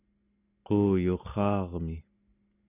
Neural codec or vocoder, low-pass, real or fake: none; 3.6 kHz; real